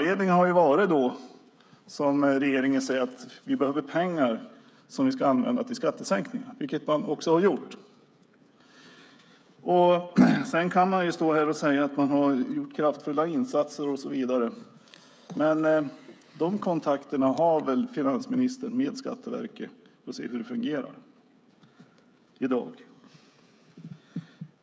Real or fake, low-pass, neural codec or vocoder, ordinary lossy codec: fake; none; codec, 16 kHz, 16 kbps, FreqCodec, smaller model; none